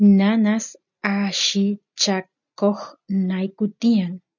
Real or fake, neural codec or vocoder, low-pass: real; none; 7.2 kHz